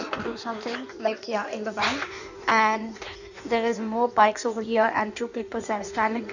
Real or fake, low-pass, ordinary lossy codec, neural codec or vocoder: fake; 7.2 kHz; none; codec, 16 kHz in and 24 kHz out, 1.1 kbps, FireRedTTS-2 codec